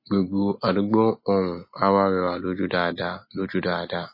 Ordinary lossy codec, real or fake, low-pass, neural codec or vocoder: MP3, 24 kbps; real; 5.4 kHz; none